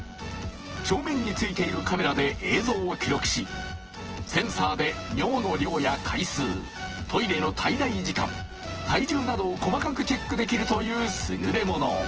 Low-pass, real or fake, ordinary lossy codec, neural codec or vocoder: 7.2 kHz; fake; Opus, 16 kbps; vocoder, 24 kHz, 100 mel bands, Vocos